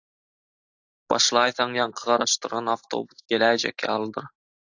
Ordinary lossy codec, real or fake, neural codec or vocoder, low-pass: AAC, 48 kbps; real; none; 7.2 kHz